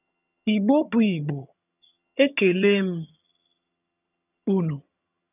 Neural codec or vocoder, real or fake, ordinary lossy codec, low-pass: vocoder, 22.05 kHz, 80 mel bands, HiFi-GAN; fake; none; 3.6 kHz